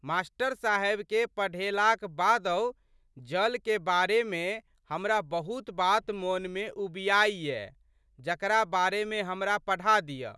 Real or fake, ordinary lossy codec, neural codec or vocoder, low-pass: real; none; none; none